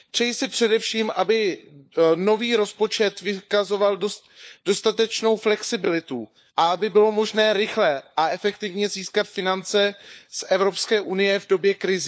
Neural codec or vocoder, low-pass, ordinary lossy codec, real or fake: codec, 16 kHz, 4 kbps, FunCodec, trained on LibriTTS, 50 frames a second; none; none; fake